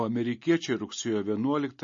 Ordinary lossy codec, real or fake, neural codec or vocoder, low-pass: MP3, 32 kbps; real; none; 7.2 kHz